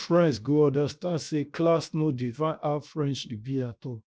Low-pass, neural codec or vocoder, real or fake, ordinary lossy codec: none; codec, 16 kHz, 0.7 kbps, FocalCodec; fake; none